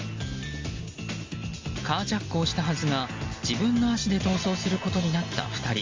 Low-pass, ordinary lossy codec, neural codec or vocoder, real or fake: 7.2 kHz; Opus, 32 kbps; none; real